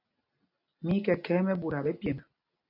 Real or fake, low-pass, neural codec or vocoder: real; 5.4 kHz; none